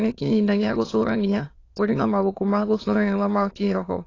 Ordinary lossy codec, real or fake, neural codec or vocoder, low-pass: AAC, 32 kbps; fake; autoencoder, 22.05 kHz, a latent of 192 numbers a frame, VITS, trained on many speakers; 7.2 kHz